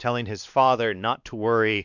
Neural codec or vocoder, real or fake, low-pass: codec, 16 kHz, 2 kbps, X-Codec, WavLM features, trained on Multilingual LibriSpeech; fake; 7.2 kHz